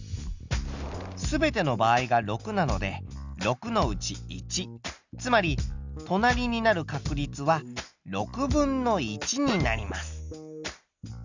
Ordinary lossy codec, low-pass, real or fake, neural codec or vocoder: Opus, 64 kbps; 7.2 kHz; real; none